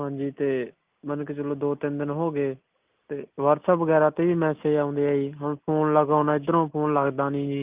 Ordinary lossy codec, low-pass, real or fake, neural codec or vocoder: Opus, 24 kbps; 3.6 kHz; real; none